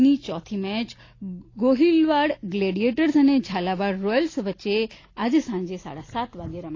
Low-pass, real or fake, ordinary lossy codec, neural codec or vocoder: 7.2 kHz; real; AAC, 32 kbps; none